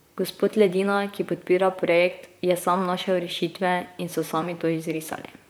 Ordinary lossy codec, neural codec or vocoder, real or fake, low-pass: none; vocoder, 44.1 kHz, 128 mel bands, Pupu-Vocoder; fake; none